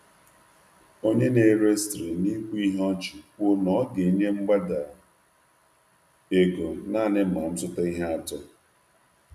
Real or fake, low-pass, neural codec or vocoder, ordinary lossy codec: real; 14.4 kHz; none; none